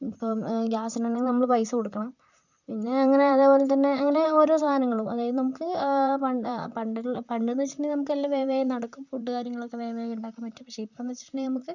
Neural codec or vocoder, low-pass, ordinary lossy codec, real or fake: vocoder, 44.1 kHz, 128 mel bands, Pupu-Vocoder; 7.2 kHz; none; fake